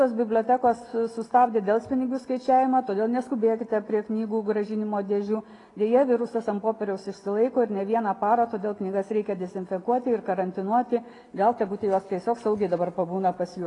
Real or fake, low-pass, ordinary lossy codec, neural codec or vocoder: real; 10.8 kHz; AAC, 32 kbps; none